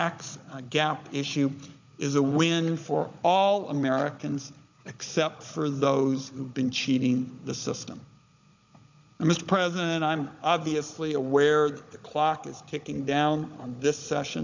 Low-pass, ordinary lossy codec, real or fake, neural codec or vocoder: 7.2 kHz; MP3, 64 kbps; fake; codec, 16 kHz, 16 kbps, FunCodec, trained on Chinese and English, 50 frames a second